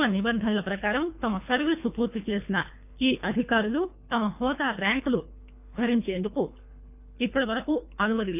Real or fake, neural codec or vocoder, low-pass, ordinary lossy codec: fake; codec, 24 kHz, 3 kbps, HILCodec; 3.6 kHz; none